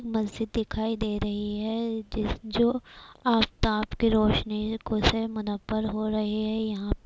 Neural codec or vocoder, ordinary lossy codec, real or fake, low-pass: none; none; real; none